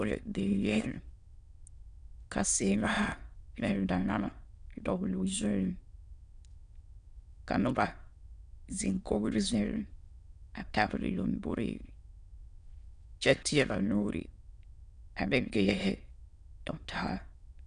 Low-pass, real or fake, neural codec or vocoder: 9.9 kHz; fake; autoencoder, 22.05 kHz, a latent of 192 numbers a frame, VITS, trained on many speakers